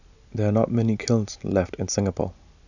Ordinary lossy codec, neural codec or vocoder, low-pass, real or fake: none; none; 7.2 kHz; real